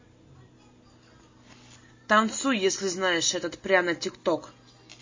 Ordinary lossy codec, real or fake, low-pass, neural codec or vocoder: MP3, 32 kbps; real; 7.2 kHz; none